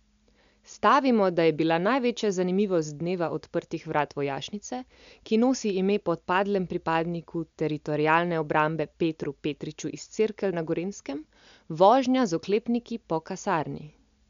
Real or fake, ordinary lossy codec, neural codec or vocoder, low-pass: real; MP3, 64 kbps; none; 7.2 kHz